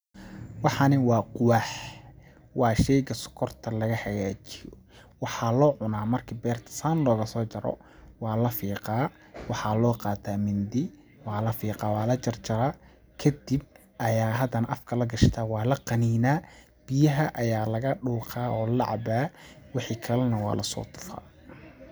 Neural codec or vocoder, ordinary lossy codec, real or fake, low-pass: none; none; real; none